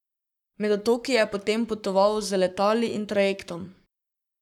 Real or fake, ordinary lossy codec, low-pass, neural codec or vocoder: fake; none; 19.8 kHz; codec, 44.1 kHz, 7.8 kbps, Pupu-Codec